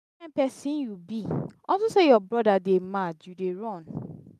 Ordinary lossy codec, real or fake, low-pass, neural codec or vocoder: none; real; 14.4 kHz; none